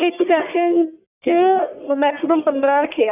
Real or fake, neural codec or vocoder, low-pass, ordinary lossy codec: fake; codec, 44.1 kHz, 1.7 kbps, Pupu-Codec; 3.6 kHz; none